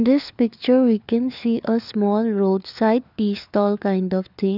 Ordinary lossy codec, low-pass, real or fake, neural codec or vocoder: none; 5.4 kHz; fake; codec, 16 kHz, 4 kbps, FunCodec, trained on LibriTTS, 50 frames a second